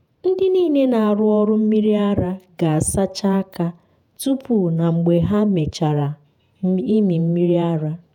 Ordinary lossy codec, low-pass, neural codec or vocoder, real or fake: none; 19.8 kHz; vocoder, 48 kHz, 128 mel bands, Vocos; fake